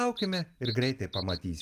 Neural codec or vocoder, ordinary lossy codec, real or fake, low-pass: none; Opus, 32 kbps; real; 14.4 kHz